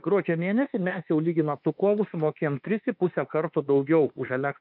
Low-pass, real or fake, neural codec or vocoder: 5.4 kHz; fake; autoencoder, 48 kHz, 32 numbers a frame, DAC-VAE, trained on Japanese speech